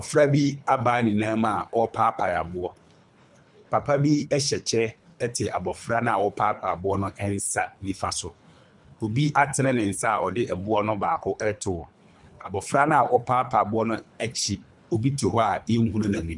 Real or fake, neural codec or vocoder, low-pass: fake; codec, 24 kHz, 3 kbps, HILCodec; 10.8 kHz